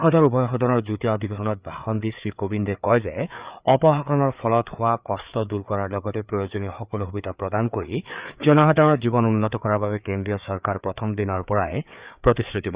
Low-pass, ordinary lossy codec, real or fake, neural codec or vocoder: 3.6 kHz; Opus, 64 kbps; fake; codec, 16 kHz, 4 kbps, FreqCodec, larger model